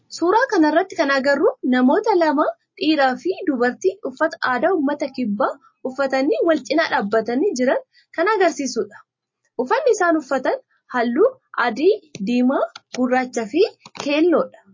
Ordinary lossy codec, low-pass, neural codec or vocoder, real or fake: MP3, 32 kbps; 7.2 kHz; none; real